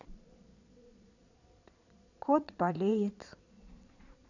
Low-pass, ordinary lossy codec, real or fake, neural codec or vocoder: 7.2 kHz; none; fake; vocoder, 22.05 kHz, 80 mel bands, Vocos